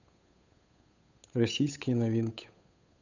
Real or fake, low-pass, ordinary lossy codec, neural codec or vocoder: fake; 7.2 kHz; none; codec, 16 kHz, 8 kbps, FunCodec, trained on Chinese and English, 25 frames a second